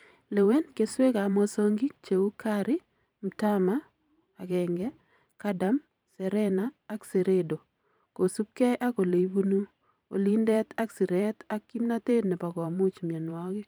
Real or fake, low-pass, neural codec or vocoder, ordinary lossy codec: fake; none; vocoder, 44.1 kHz, 128 mel bands every 512 samples, BigVGAN v2; none